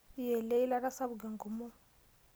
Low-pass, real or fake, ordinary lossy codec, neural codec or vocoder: none; real; none; none